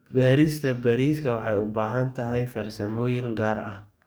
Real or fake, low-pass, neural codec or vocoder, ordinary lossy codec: fake; none; codec, 44.1 kHz, 2.6 kbps, DAC; none